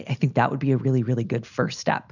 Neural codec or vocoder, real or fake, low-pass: none; real; 7.2 kHz